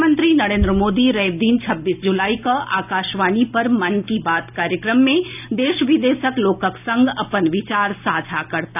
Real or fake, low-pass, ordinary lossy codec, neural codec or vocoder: real; 3.6 kHz; none; none